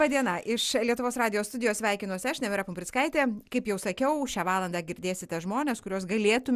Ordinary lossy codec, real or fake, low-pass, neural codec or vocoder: Opus, 64 kbps; real; 14.4 kHz; none